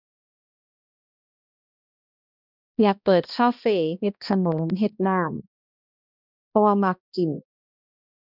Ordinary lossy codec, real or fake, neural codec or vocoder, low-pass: none; fake; codec, 16 kHz, 1 kbps, X-Codec, HuBERT features, trained on balanced general audio; 5.4 kHz